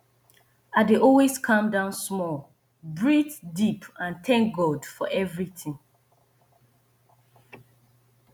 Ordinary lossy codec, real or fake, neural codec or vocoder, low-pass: none; real; none; 19.8 kHz